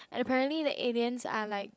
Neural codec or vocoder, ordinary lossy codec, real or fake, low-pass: codec, 16 kHz, 16 kbps, FreqCodec, larger model; none; fake; none